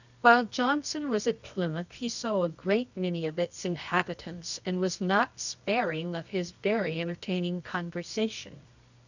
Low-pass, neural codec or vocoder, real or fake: 7.2 kHz; codec, 24 kHz, 0.9 kbps, WavTokenizer, medium music audio release; fake